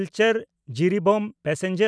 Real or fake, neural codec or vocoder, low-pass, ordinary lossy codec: real; none; none; none